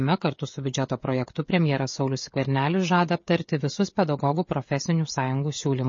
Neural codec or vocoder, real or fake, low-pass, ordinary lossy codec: codec, 16 kHz, 16 kbps, FreqCodec, smaller model; fake; 7.2 kHz; MP3, 32 kbps